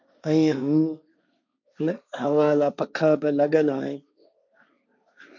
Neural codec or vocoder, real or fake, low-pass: codec, 16 kHz, 1.1 kbps, Voila-Tokenizer; fake; 7.2 kHz